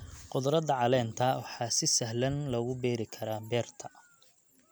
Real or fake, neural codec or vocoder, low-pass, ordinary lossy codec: real; none; none; none